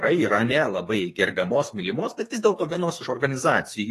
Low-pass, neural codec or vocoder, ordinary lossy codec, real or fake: 14.4 kHz; codec, 32 kHz, 1.9 kbps, SNAC; AAC, 48 kbps; fake